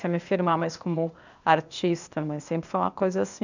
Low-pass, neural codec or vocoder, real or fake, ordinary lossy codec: 7.2 kHz; codec, 16 kHz, 0.8 kbps, ZipCodec; fake; none